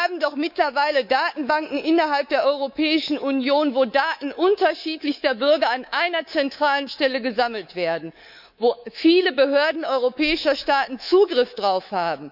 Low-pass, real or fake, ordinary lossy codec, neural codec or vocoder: 5.4 kHz; fake; none; codec, 24 kHz, 3.1 kbps, DualCodec